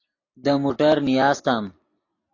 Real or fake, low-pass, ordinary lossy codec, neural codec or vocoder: real; 7.2 kHz; AAC, 32 kbps; none